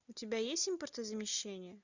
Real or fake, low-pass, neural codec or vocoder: real; 7.2 kHz; none